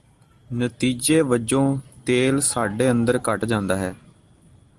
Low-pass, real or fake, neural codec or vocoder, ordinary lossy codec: 10.8 kHz; real; none; Opus, 24 kbps